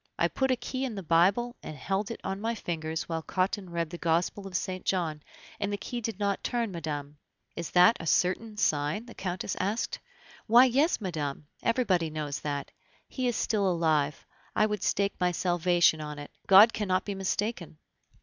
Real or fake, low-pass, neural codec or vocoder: real; 7.2 kHz; none